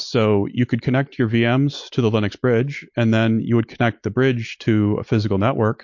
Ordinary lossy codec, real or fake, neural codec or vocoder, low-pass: MP3, 48 kbps; real; none; 7.2 kHz